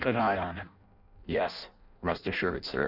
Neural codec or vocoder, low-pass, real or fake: codec, 16 kHz in and 24 kHz out, 0.6 kbps, FireRedTTS-2 codec; 5.4 kHz; fake